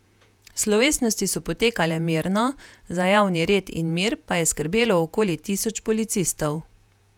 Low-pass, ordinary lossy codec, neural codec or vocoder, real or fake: 19.8 kHz; none; vocoder, 48 kHz, 128 mel bands, Vocos; fake